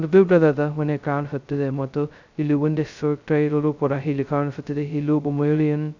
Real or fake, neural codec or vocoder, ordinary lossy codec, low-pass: fake; codec, 16 kHz, 0.2 kbps, FocalCodec; none; 7.2 kHz